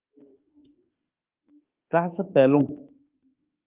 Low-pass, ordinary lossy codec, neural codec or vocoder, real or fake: 3.6 kHz; Opus, 24 kbps; autoencoder, 48 kHz, 32 numbers a frame, DAC-VAE, trained on Japanese speech; fake